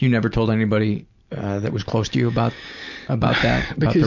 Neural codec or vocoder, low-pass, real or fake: none; 7.2 kHz; real